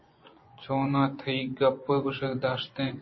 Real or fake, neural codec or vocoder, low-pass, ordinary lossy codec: fake; vocoder, 24 kHz, 100 mel bands, Vocos; 7.2 kHz; MP3, 24 kbps